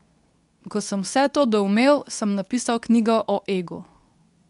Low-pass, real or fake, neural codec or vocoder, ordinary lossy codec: 10.8 kHz; fake; codec, 24 kHz, 0.9 kbps, WavTokenizer, medium speech release version 2; none